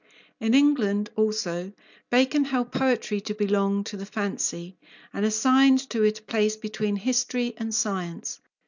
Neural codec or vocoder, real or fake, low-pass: none; real; 7.2 kHz